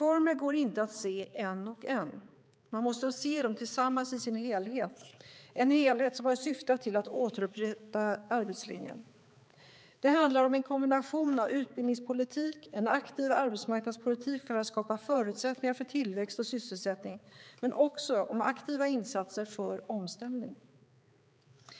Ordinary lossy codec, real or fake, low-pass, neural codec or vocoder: none; fake; none; codec, 16 kHz, 4 kbps, X-Codec, HuBERT features, trained on balanced general audio